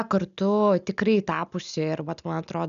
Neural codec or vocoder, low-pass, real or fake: none; 7.2 kHz; real